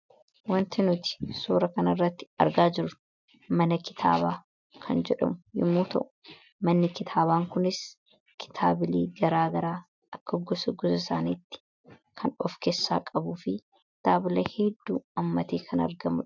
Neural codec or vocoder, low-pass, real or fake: none; 7.2 kHz; real